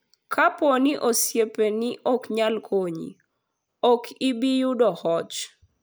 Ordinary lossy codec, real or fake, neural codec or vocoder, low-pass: none; real; none; none